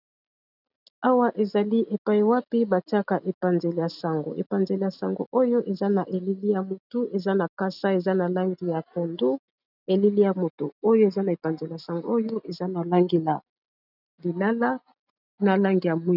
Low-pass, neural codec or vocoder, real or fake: 5.4 kHz; none; real